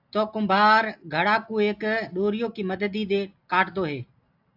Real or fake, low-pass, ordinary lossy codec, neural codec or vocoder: real; 5.4 kHz; AAC, 48 kbps; none